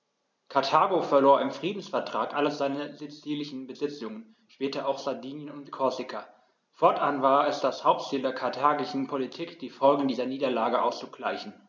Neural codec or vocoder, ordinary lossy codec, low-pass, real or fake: none; none; none; real